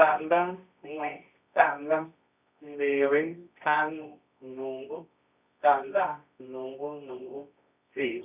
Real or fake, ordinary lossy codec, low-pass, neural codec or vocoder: fake; none; 3.6 kHz; codec, 24 kHz, 0.9 kbps, WavTokenizer, medium music audio release